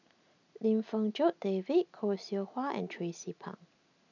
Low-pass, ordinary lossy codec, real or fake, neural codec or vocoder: 7.2 kHz; none; real; none